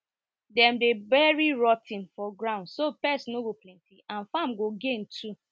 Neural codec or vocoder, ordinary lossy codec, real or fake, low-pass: none; none; real; none